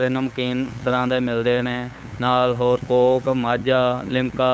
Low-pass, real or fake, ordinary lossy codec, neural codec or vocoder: none; fake; none; codec, 16 kHz, 8 kbps, FunCodec, trained on LibriTTS, 25 frames a second